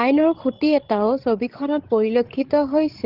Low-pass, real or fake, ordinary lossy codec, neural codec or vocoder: 5.4 kHz; fake; Opus, 16 kbps; codec, 16 kHz, 16 kbps, FreqCodec, larger model